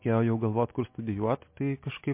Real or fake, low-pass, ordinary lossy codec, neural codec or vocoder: real; 3.6 kHz; MP3, 24 kbps; none